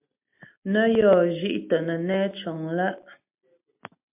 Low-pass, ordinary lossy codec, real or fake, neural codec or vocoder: 3.6 kHz; MP3, 32 kbps; real; none